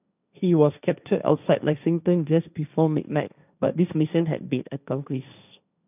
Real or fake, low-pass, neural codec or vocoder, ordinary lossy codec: fake; 3.6 kHz; codec, 16 kHz, 1.1 kbps, Voila-Tokenizer; none